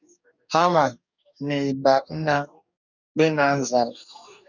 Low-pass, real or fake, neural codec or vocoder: 7.2 kHz; fake; codec, 44.1 kHz, 2.6 kbps, DAC